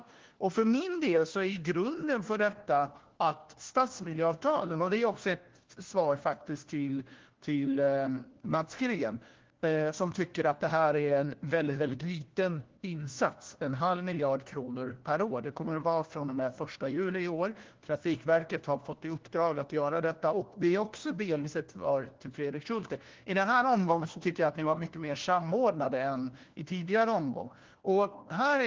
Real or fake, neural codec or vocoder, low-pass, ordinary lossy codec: fake; codec, 16 kHz, 1 kbps, FunCodec, trained on LibriTTS, 50 frames a second; 7.2 kHz; Opus, 16 kbps